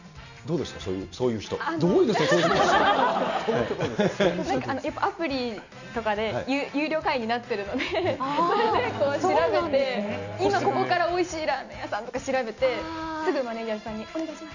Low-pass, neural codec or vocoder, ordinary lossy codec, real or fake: 7.2 kHz; none; none; real